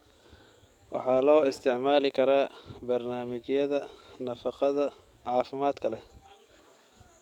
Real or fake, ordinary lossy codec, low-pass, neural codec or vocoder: fake; none; 19.8 kHz; codec, 44.1 kHz, 7.8 kbps, DAC